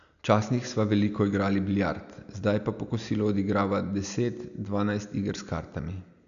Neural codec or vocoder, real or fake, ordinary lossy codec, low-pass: none; real; none; 7.2 kHz